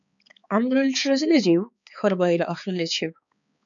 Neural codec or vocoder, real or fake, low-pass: codec, 16 kHz, 4 kbps, X-Codec, HuBERT features, trained on balanced general audio; fake; 7.2 kHz